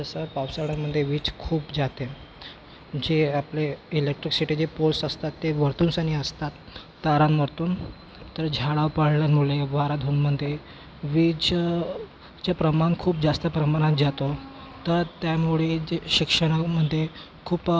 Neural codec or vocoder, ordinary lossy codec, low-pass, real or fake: none; none; none; real